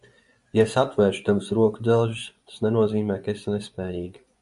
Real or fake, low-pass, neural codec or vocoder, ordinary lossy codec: fake; 10.8 kHz; vocoder, 24 kHz, 100 mel bands, Vocos; AAC, 96 kbps